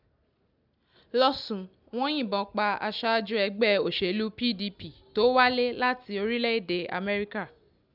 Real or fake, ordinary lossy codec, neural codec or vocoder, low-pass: real; none; none; 5.4 kHz